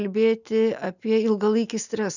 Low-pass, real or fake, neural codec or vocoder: 7.2 kHz; real; none